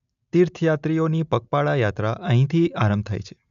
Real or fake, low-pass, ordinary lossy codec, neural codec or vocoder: real; 7.2 kHz; none; none